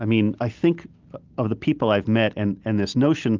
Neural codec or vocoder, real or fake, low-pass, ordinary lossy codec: none; real; 7.2 kHz; Opus, 32 kbps